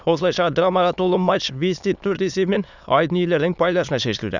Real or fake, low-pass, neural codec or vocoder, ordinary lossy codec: fake; 7.2 kHz; autoencoder, 22.05 kHz, a latent of 192 numbers a frame, VITS, trained on many speakers; none